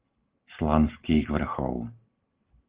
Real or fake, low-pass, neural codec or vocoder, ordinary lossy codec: real; 3.6 kHz; none; Opus, 16 kbps